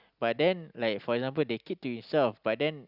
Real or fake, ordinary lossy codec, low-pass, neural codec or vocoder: real; Opus, 64 kbps; 5.4 kHz; none